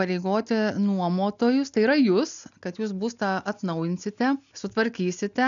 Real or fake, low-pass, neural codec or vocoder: real; 7.2 kHz; none